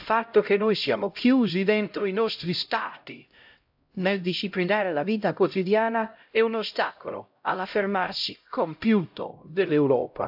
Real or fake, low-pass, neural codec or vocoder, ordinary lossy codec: fake; 5.4 kHz; codec, 16 kHz, 0.5 kbps, X-Codec, HuBERT features, trained on LibriSpeech; AAC, 48 kbps